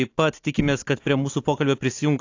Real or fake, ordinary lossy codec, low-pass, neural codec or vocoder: real; AAC, 48 kbps; 7.2 kHz; none